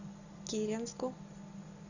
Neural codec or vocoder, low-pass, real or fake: none; 7.2 kHz; real